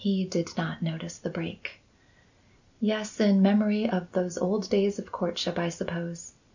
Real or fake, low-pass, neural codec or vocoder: real; 7.2 kHz; none